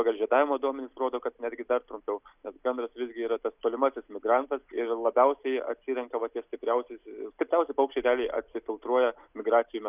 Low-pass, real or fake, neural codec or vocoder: 3.6 kHz; real; none